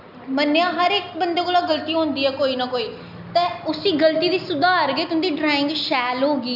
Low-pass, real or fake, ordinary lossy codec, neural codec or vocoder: 5.4 kHz; real; none; none